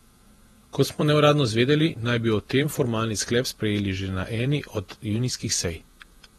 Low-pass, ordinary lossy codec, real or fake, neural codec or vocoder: 19.8 kHz; AAC, 32 kbps; fake; vocoder, 48 kHz, 128 mel bands, Vocos